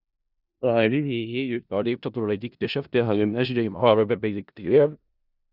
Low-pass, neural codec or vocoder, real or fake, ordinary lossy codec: 5.4 kHz; codec, 16 kHz in and 24 kHz out, 0.4 kbps, LongCat-Audio-Codec, four codebook decoder; fake; Opus, 64 kbps